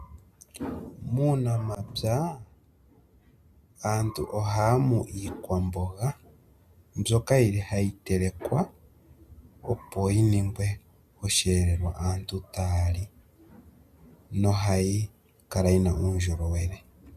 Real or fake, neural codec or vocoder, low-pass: real; none; 14.4 kHz